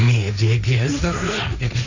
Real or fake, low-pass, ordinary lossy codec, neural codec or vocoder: fake; 7.2 kHz; none; codec, 16 kHz, 1.1 kbps, Voila-Tokenizer